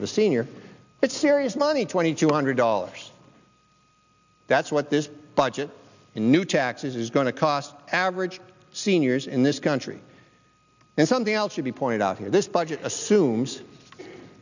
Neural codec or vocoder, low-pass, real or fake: none; 7.2 kHz; real